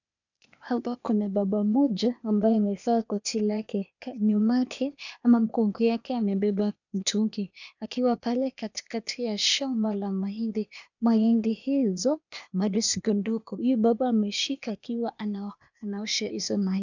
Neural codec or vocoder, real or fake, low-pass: codec, 16 kHz, 0.8 kbps, ZipCodec; fake; 7.2 kHz